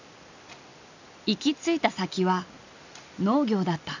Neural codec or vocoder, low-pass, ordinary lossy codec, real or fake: none; 7.2 kHz; none; real